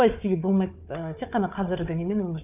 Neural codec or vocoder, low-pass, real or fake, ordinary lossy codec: codec, 16 kHz, 4 kbps, FunCodec, trained on Chinese and English, 50 frames a second; 3.6 kHz; fake; none